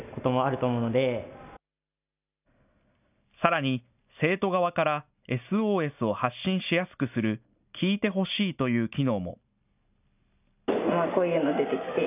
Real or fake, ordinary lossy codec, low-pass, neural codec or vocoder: real; none; 3.6 kHz; none